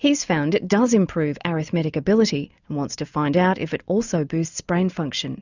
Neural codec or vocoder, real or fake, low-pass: none; real; 7.2 kHz